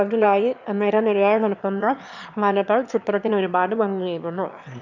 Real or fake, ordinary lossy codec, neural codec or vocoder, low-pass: fake; none; autoencoder, 22.05 kHz, a latent of 192 numbers a frame, VITS, trained on one speaker; 7.2 kHz